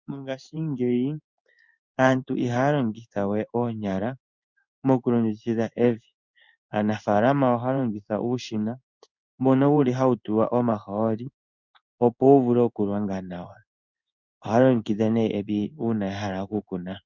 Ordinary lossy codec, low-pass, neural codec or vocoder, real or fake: Opus, 64 kbps; 7.2 kHz; codec, 16 kHz in and 24 kHz out, 1 kbps, XY-Tokenizer; fake